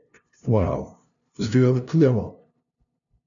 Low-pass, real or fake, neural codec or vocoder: 7.2 kHz; fake; codec, 16 kHz, 0.5 kbps, FunCodec, trained on LibriTTS, 25 frames a second